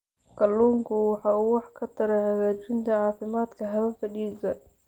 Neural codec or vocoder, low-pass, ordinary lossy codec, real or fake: none; 19.8 kHz; Opus, 32 kbps; real